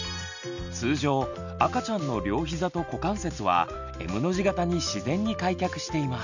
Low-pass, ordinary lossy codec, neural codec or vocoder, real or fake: 7.2 kHz; none; none; real